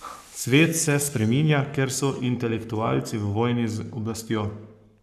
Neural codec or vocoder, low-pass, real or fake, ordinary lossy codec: codec, 44.1 kHz, 7.8 kbps, Pupu-Codec; 14.4 kHz; fake; none